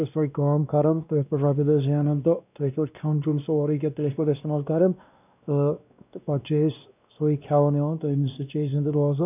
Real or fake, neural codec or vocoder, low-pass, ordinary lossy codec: fake; codec, 16 kHz, 1 kbps, X-Codec, WavLM features, trained on Multilingual LibriSpeech; 3.6 kHz; none